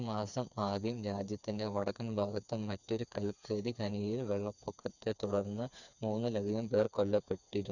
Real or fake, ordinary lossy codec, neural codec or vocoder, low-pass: fake; none; codec, 16 kHz, 4 kbps, FreqCodec, smaller model; 7.2 kHz